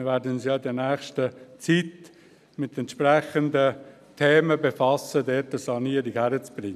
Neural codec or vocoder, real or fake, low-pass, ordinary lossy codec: none; real; 14.4 kHz; none